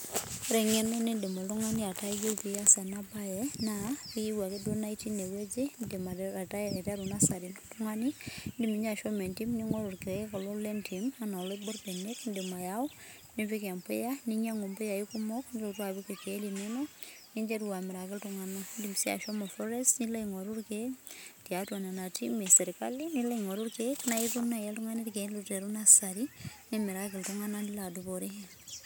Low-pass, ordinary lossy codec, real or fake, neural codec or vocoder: none; none; real; none